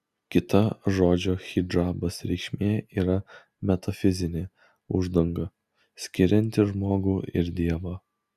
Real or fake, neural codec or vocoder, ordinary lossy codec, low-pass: real; none; Opus, 64 kbps; 14.4 kHz